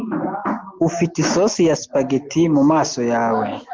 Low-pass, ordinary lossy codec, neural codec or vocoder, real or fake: 7.2 kHz; Opus, 32 kbps; none; real